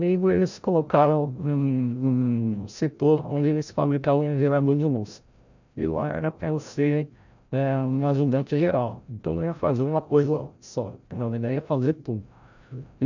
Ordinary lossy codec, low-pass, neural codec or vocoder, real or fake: none; 7.2 kHz; codec, 16 kHz, 0.5 kbps, FreqCodec, larger model; fake